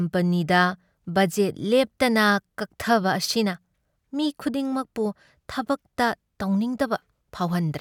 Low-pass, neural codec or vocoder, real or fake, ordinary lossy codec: 19.8 kHz; none; real; none